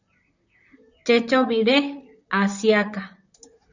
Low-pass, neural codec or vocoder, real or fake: 7.2 kHz; vocoder, 44.1 kHz, 128 mel bands, Pupu-Vocoder; fake